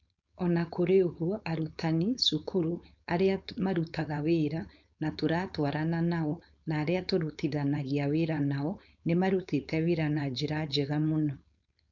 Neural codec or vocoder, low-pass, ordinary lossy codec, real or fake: codec, 16 kHz, 4.8 kbps, FACodec; 7.2 kHz; none; fake